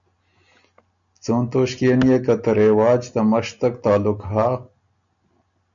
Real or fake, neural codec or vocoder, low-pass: real; none; 7.2 kHz